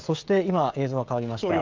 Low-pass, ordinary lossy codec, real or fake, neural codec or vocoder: 7.2 kHz; Opus, 24 kbps; fake; codec, 16 kHz, 16 kbps, FreqCodec, smaller model